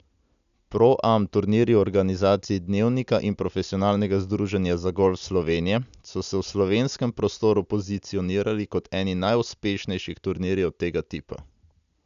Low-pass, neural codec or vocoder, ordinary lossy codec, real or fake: 7.2 kHz; none; none; real